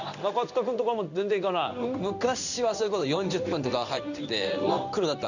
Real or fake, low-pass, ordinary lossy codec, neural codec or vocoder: fake; 7.2 kHz; none; codec, 16 kHz in and 24 kHz out, 1 kbps, XY-Tokenizer